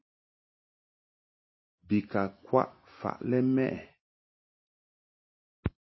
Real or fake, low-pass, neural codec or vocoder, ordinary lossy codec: real; 7.2 kHz; none; MP3, 24 kbps